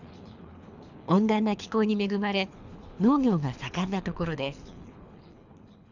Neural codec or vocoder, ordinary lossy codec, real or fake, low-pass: codec, 24 kHz, 3 kbps, HILCodec; none; fake; 7.2 kHz